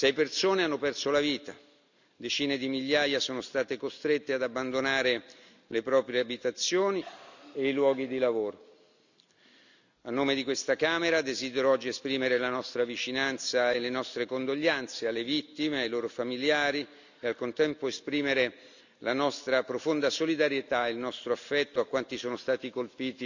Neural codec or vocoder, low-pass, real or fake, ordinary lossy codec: none; 7.2 kHz; real; none